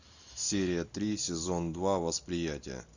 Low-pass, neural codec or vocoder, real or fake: 7.2 kHz; none; real